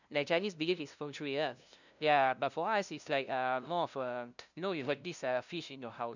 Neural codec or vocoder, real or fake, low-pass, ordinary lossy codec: codec, 16 kHz, 0.5 kbps, FunCodec, trained on LibriTTS, 25 frames a second; fake; 7.2 kHz; none